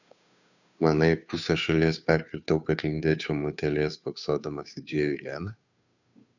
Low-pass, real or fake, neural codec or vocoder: 7.2 kHz; fake; codec, 16 kHz, 2 kbps, FunCodec, trained on Chinese and English, 25 frames a second